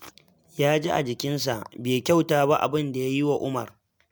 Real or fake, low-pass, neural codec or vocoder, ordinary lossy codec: real; none; none; none